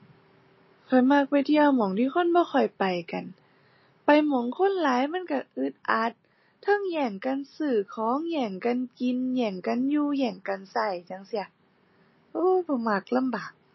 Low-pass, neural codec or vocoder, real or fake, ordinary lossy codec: 7.2 kHz; none; real; MP3, 24 kbps